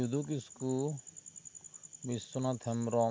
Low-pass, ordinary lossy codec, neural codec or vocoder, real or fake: none; none; none; real